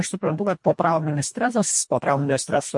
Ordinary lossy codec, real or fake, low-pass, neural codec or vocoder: MP3, 48 kbps; fake; 10.8 kHz; codec, 24 kHz, 1.5 kbps, HILCodec